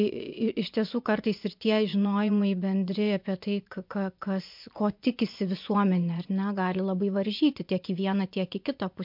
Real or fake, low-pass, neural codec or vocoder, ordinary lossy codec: fake; 5.4 kHz; vocoder, 24 kHz, 100 mel bands, Vocos; MP3, 48 kbps